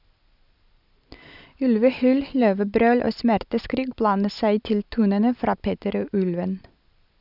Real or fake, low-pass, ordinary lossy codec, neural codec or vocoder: real; 5.4 kHz; none; none